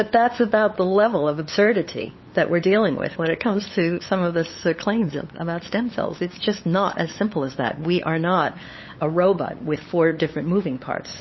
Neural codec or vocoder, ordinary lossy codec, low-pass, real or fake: codec, 16 kHz, 8 kbps, FunCodec, trained on LibriTTS, 25 frames a second; MP3, 24 kbps; 7.2 kHz; fake